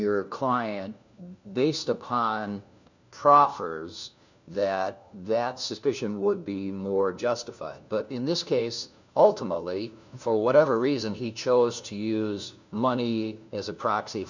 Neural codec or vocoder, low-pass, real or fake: codec, 16 kHz, 1 kbps, FunCodec, trained on LibriTTS, 50 frames a second; 7.2 kHz; fake